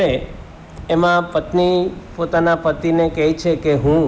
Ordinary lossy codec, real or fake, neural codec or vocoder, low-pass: none; real; none; none